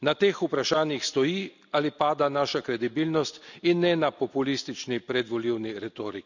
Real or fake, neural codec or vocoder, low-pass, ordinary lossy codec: real; none; 7.2 kHz; none